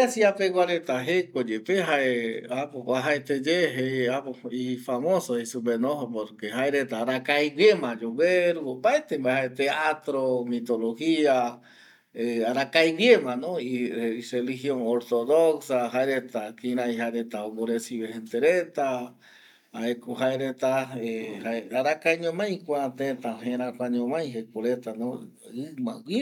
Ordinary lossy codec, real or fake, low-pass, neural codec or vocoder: none; real; 19.8 kHz; none